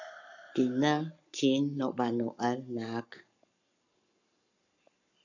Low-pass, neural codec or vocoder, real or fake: 7.2 kHz; codec, 44.1 kHz, 7.8 kbps, Pupu-Codec; fake